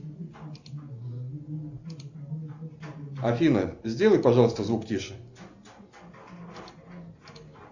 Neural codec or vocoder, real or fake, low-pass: none; real; 7.2 kHz